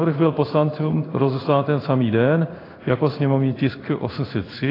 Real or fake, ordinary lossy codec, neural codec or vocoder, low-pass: fake; AAC, 24 kbps; codec, 16 kHz in and 24 kHz out, 1 kbps, XY-Tokenizer; 5.4 kHz